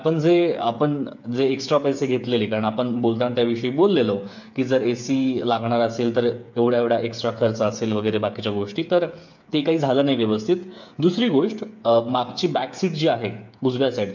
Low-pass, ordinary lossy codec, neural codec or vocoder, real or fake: 7.2 kHz; MP3, 64 kbps; codec, 16 kHz, 8 kbps, FreqCodec, smaller model; fake